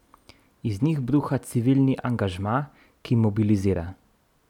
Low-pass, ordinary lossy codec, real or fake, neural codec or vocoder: 19.8 kHz; none; real; none